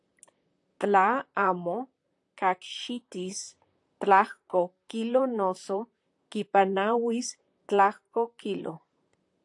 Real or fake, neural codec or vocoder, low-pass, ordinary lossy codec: fake; vocoder, 44.1 kHz, 128 mel bands, Pupu-Vocoder; 10.8 kHz; AAC, 64 kbps